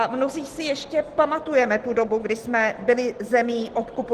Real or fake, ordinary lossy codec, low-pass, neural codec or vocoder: real; Opus, 16 kbps; 14.4 kHz; none